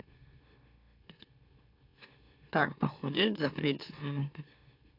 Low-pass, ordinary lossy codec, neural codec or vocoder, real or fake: 5.4 kHz; AAC, 32 kbps; autoencoder, 44.1 kHz, a latent of 192 numbers a frame, MeloTTS; fake